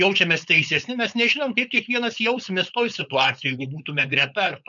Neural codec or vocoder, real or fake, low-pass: codec, 16 kHz, 4.8 kbps, FACodec; fake; 7.2 kHz